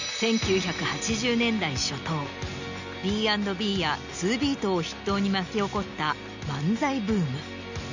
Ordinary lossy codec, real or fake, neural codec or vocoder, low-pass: none; real; none; 7.2 kHz